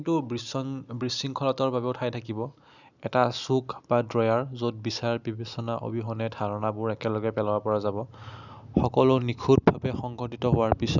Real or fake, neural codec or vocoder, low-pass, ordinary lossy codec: real; none; 7.2 kHz; none